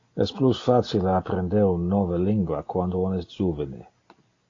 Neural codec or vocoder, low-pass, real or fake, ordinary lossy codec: none; 7.2 kHz; real; AAC, 32 kbps